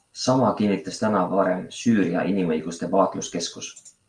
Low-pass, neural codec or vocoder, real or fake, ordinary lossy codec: 9.9 kHz; none; real; Opus, 32 kbps